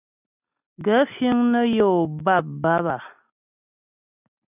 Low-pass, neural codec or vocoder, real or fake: 3.6 kHz; none; real